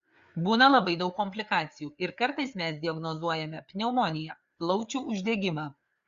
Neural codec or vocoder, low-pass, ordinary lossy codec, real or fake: codec, 16 kHz, 4 kbps, FreqCodec, larger model; 7.2 kHz; Opus, 64 kbps; fake